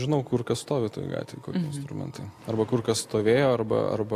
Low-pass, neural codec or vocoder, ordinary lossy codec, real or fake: 14.4 kHz; none; AAC, 64 kbps; real